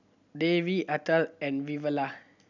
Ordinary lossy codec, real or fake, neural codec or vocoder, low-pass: none; real; none; 7.2 kHz